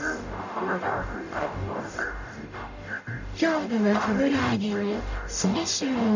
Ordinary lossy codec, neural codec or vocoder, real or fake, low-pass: none; codec, 44.1 kHz, 0.9 kbps, DAC; fake; 7.2 kHz